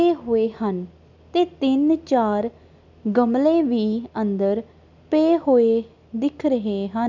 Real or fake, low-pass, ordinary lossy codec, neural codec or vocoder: real; 7.2 kHz; none; none